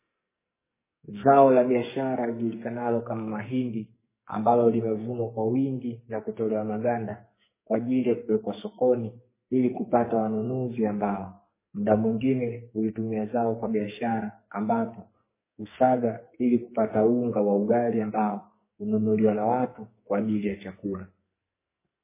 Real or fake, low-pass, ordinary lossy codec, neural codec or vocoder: fake; 3.6 kHz; MP3, 16 kbps; codec, 44.1 kHz, 2.6 kbps, SNAC